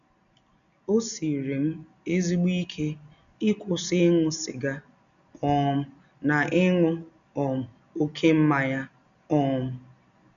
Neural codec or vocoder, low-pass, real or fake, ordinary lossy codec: none; 7.2 kHz; real; none